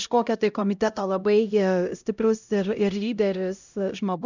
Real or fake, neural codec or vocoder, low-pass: fake; codec, 16 kHz, 0.5 kbps, X-Codec, HuBERT features, trained on LibriSpeech; 7.2 kHz